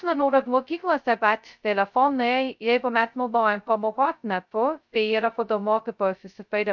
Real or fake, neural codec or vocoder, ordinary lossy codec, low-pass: fake; codec, 16 kHz, 0.2 kbps, FocalCodec; MP3, 64 kbps; 7.2 kHz